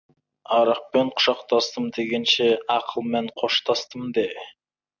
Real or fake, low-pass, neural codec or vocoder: real; 7.2 kHz; none